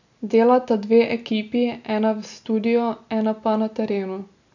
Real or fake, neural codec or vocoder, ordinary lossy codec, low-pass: real; none; none; 7.2 kHz